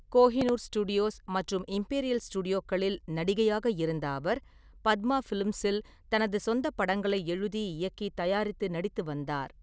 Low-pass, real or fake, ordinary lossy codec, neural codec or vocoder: none; real; none; none